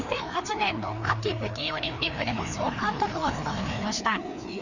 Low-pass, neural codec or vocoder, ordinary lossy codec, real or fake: 7.2 kHz; codec, 16 kHz, 2 kbps, FreqCodec, larger model; none; fake